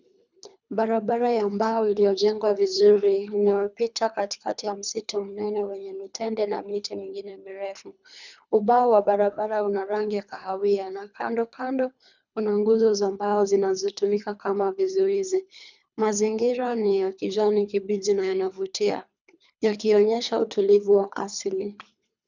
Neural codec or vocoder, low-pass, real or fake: codec, 24 kHz, 3 kbps, HILCodec; 7.2 kHz; fake